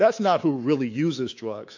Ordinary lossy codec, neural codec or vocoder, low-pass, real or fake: AAC, 48 kbps; codec, 24 kHz, 3.1 kbps, DualCodec; 7.2 kHz; fake